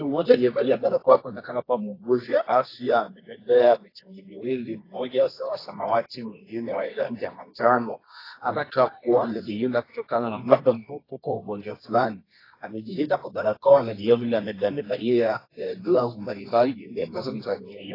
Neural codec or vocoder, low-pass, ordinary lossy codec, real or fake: codec, 24 kHz, 0.9 kbps, WavTokenizer, medium music audio release; 5.4 kHz; AAC, 24 kbps; fake